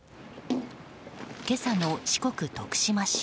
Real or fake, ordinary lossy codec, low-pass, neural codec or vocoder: real; none; none; none